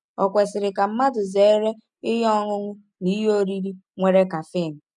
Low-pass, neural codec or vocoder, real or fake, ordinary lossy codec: 10.8 kHz; none; real; none